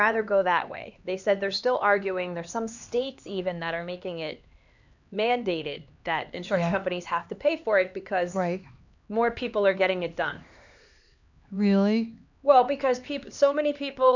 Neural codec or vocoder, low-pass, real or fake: codec, 16 kHz, 2 kbps, X-Codec, HuBERT features, trained on LibriSpeech; 7.2 kHz; fake